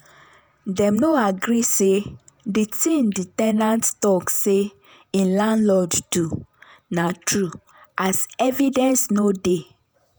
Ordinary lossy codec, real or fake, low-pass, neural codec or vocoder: none; fake; none; vocoder, 48 kHz, 128 mel bands, Vocos